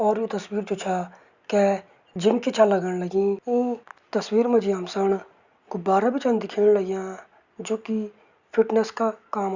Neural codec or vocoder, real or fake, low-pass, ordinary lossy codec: none; real; 7.2 kHz; Opus, 64 kbps